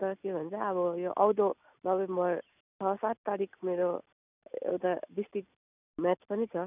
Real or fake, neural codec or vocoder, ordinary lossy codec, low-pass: real; none; none; 3.6 kHz